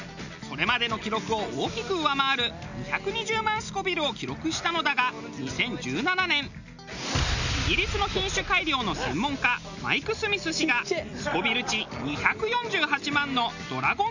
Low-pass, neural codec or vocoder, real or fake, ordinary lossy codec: 7.2 kHz; none; real; none